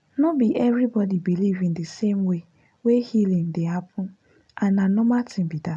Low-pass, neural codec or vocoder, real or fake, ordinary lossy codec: none; none; real; none